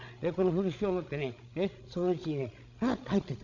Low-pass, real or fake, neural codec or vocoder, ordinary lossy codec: 7.2 kHz; fake; codec, 16 kHz, 16 kbps, FreqCodec, larger model; none